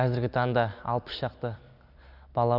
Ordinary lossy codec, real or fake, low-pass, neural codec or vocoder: none; real; 5.4 kHz; none